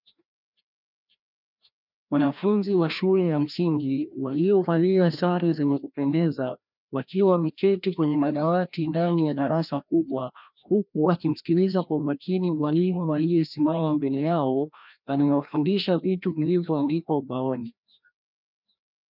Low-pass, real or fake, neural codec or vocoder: 5.4 kHz; fake; codec, 16 kHz, 1 kbps, FreqCodec, larger model